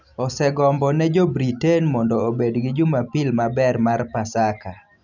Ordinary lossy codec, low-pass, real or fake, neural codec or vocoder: none; 7.2 kHz; real; none